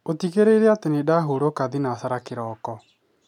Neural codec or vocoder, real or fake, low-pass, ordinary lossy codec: none; real; 19.8 kHz; MP3, 96 kbps